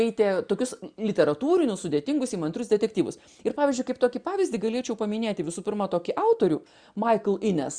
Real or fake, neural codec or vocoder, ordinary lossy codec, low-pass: real; none; Opus, 64 kbps; 9.9 kHz